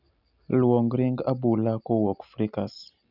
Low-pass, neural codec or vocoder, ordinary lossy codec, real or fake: 5.4 kHz; none; none; real